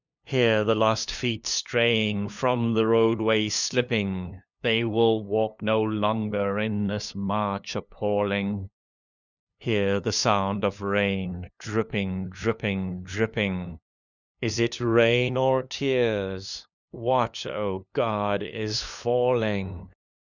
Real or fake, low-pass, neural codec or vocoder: fake; 7.2 kHz; codec, 16 kHz, 2 kbps, FunCodec, trained on LibriTTS, 25 frames a second